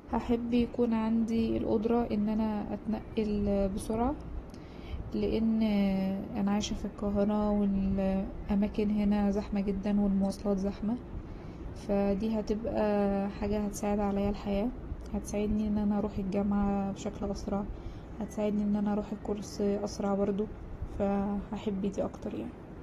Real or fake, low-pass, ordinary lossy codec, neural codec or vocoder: real; 19.8 kHz; AAC, 32 kbps; none